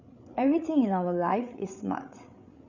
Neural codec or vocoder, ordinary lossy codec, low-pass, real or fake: codec, 16 kHz, 8 kbps, FreqCodec, larger model; none; 7.2 kHz; fake